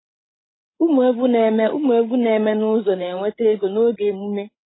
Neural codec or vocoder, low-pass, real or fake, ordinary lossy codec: codec, 16 kHz, 16 kbps, FreqCodec, larger model; 7.2 kHz; fake; AAC, 16 kbps